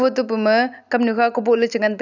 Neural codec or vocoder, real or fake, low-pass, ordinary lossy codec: none; real; 7.2 kHz; none